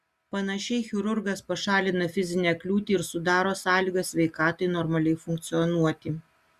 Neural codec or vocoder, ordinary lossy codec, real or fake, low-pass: none; Opus, 64 kbps; real; 14.4 kHz